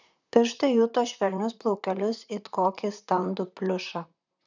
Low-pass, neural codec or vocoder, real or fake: 7.2 kHz; vocoder, 44.1 kHz, 128 mel bands, Pupu-Vocoder; fake